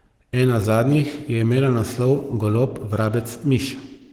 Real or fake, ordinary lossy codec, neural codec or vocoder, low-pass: fake; Opus, 16 kbps; codec, 44.1 kHz, 7.8 kbps, Pupu-Codec; 19.8 kHz